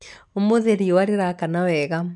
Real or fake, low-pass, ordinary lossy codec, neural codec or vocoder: real; 10.8 kHz; none; none